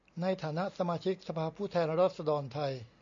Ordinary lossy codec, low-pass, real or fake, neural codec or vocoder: MP3, 64 kbps; 7.2 kHz; real; none